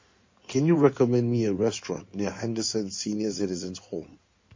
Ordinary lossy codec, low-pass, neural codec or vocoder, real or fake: MP3, 32 kbps; 7.2 kHz; codec, 16 kHz in and 24 kHz out, 2.2 kbps, FireRedTTS-2 codec; fake